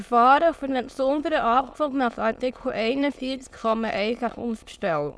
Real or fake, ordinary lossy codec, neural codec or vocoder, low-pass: fake; none; autoencoder, 22.05 kHz, a latent of 192 numbers a frame, VITS, trained on many speakers; none